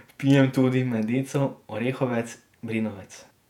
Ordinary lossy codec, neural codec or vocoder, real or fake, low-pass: none; vocoder, 48 kHz, 128 mel bands, Vocos; fake; 19.8 kHz